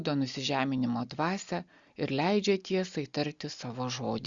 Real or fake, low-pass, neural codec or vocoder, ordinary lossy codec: real; 7.2 kHz; none; Opus, 64 kbps